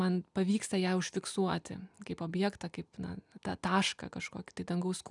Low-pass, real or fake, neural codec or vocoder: 10.8 kHz; real; none